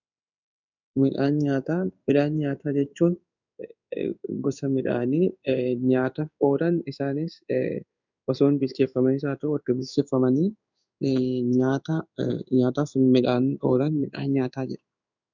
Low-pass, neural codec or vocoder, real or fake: 7.2 kHz; codec, 16 kHz in and 24 kHz out, 1 kbps, XY-Tokenizer; fake